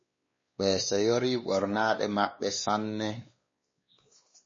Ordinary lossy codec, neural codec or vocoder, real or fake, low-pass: MP3, 32 kbps; codec, 16 kHz, 2 kbps, X-Codec, WavLM features, trained on Multilingual LibriSpeech; fake; 7.2 kHz